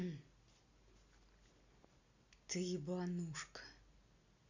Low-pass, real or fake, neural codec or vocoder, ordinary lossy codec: 7.2 kHz; real; none; Opus, 64 kbps